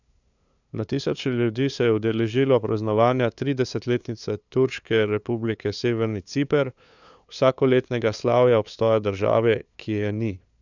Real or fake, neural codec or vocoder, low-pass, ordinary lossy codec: fake; codec, 16 kHz, 2 kbps, FunCodec, trained on LibriTTS, 25 frames a second; 7.2 kHz; none